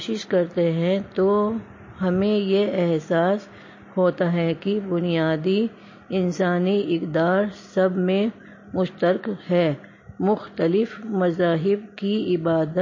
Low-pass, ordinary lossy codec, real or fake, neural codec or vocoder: 7.2 kHz; MP3, 32 kbps; real; none